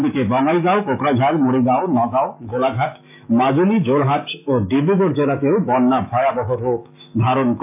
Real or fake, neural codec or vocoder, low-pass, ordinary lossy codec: fake; autoencoder, 48 kHz, 128 numbers a frame, DAC-VAE, trained on Japanese speech; 3.6 kHz; AAC, 32 kbps